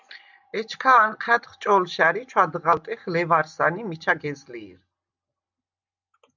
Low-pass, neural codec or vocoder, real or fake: 7.2 kHz; none; real